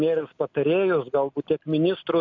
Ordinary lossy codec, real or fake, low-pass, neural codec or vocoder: MP3, 64 kbps; real; 7.2 kHz; none